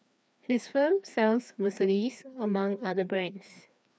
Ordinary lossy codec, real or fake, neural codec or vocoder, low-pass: none; fake; codec, 16 kHz, 2 kbps, FreqCodec, larger model; none